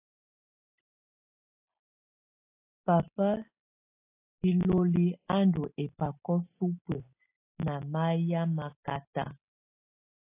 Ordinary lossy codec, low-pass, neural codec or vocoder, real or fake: AAC, 24 kbps; 3.6 kHz; none; real